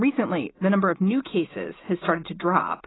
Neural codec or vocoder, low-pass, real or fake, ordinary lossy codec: none; 7.2 kHz; real; AAC, 16 kbps